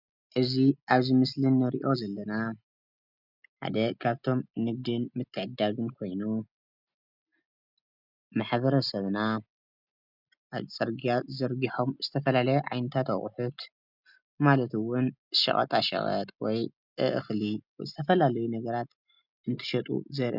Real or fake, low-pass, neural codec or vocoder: real; 5.4 kHz; none